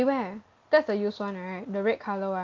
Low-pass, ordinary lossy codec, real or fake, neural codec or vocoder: 7.2 kHz; Opus, 32 kbps; real; none